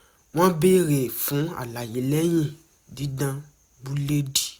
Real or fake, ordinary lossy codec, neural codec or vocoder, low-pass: real; none; none; none